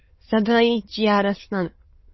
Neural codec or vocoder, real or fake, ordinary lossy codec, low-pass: autoencoder, 22.05 kHz, a latent of 192 numbers a frame, VITS, trained on many speakers; fake; MP3, 24 kbps; 7.2 kHz